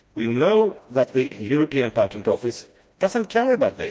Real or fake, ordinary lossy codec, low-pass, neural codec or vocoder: fake; none; none; codec, 16 kHz, 1 kbps, FreqCodec, smaller model